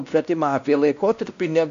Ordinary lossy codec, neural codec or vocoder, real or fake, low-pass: AAC, 96 kbps; codec, 16 kHz, 1 kbps, X-Codec, WavLM features, trained on Multilingual LibriSpeech; fake; 7.2 kHz